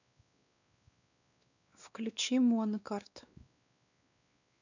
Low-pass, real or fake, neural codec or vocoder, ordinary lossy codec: 7.2 kHz; fake; codec, 16 kHz, 2 kbps, X-Codec, WavLM features, trained on Multilingual LibriSpeech; none